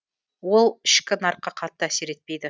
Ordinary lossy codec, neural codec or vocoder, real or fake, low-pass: none; none; real; none